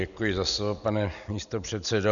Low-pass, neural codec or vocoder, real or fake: 7.2 kHz; none; real